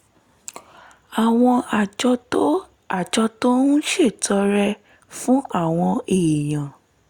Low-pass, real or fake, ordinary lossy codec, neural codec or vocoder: 19.8 kHz; real; Opus, 64 kbps; none